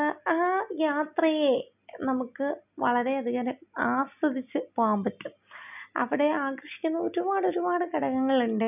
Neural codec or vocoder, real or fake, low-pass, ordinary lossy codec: none; real; 3.6 kHz; none